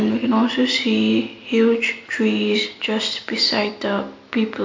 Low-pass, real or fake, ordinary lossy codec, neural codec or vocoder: 7.2 kHz; real; MP3, 48 kbps; none